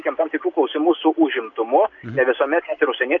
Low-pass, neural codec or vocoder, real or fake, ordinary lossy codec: 7.2 kHz; none; real; AAC, 64 kbps